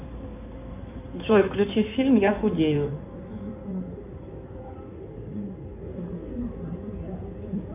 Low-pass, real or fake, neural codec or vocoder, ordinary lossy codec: 3.6 kHz; fake; codec, 16 kHz in and 24 kHz out, 2.2 kbps, FireRedTTS-2 codec; MP3, 32 kbps